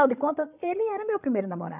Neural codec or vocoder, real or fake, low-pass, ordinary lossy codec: codec, 16 kHz, 16 kbps, FreqCodec, larger model; fake; 3.6 kHz; none